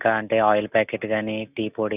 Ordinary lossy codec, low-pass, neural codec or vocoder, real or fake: none; 3.6 kHz; none; real